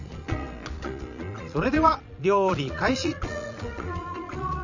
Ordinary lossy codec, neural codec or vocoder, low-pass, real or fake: none; vocoder, 22.05 kHz, 80 mel bands, Vocos; 7.2 kHz; fake